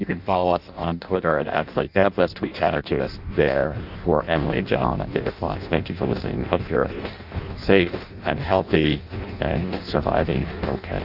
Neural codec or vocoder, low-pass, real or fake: codec, 16 kHz in and 24 kHz out, 0.6 kbps, FireRedTTS-2 codec; 5.4 kHz; fake